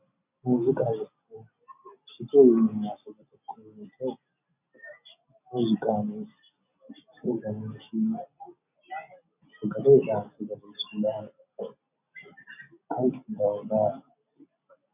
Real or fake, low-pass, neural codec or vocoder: real; 3.6 kHz; none